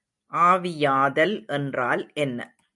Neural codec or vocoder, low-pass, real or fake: none; 10.8 kHz; real